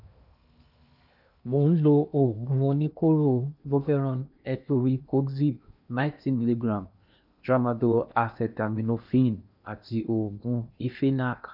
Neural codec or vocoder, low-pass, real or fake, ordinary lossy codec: codec, 16 kHz in and 24 kHz out, 0.8 kbps, FocalCodec, streaming, 65536 codes; 5.4 kHz; fake; none